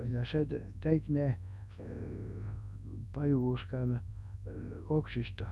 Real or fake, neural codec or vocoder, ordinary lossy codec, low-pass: fake; codec, 24 kHz, 0.9 kbps, WavTokenizer, large speech release; none; none